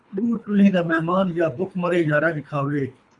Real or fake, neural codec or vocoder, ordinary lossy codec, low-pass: fake; codec, 24 kHz, 3 kbps, HILCodec; MP3, 96 kbps; 10.8 kHz